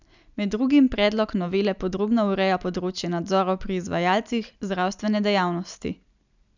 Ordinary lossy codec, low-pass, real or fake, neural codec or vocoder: none; 7.2 kHz; real; none